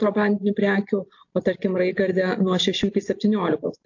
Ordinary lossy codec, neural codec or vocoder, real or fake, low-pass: AAC, 48 kbps; none; real; 7.2 kHz